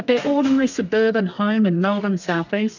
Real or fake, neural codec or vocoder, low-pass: fake; codec, 32 kHz, 1.9 kbps, SNAC; 7.2 kHz